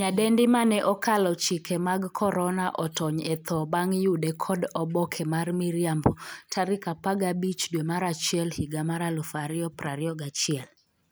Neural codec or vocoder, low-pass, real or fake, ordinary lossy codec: none; none; real; none